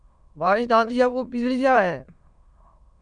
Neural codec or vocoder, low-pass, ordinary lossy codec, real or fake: autoencoder, 22.05 kHz, a latent of 192 numbers a frame, VITS, trained on many speakers; 9.9 kHz; MP3, 96 kbps; fake